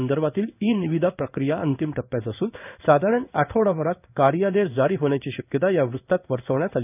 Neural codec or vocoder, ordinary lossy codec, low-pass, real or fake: codec, 16 kHz in and 24 kHz out, 1 kbps, XY-Tokenizer; none; 3.6 kHz; fake